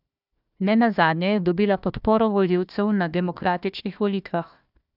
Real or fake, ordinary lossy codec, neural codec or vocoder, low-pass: fake; none; codec, 16 kHz, 1 kbps, FunCodec, trained on Chinese and English, 50 frames a second; 5.4 kHz